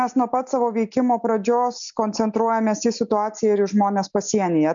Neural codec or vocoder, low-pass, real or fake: none; 7.2 kHz; real